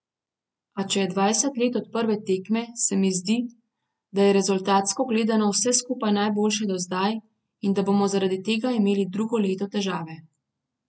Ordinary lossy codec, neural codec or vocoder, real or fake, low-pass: none; none; real; none